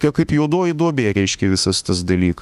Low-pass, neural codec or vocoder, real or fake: 14.4 kHz; autoencoder, 48 kHz, 32 numbers a frame, DAC-VAE, trained on Japanese speech; fake